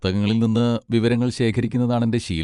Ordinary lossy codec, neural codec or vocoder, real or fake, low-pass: none; vocoder, 24 kHz, 100 mel bands, Vocos; fake; 10.8 kHz